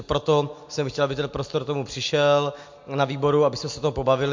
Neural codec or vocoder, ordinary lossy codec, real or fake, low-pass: none; MP3, 48 kbps; real; 7.2 kHz